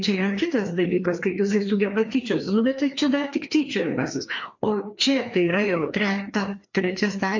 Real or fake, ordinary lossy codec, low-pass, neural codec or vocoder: fake; MP3, 48 kbps; 7.2 kHz; codec, 16 kHz, 2 kbps, FreqCodec, larger model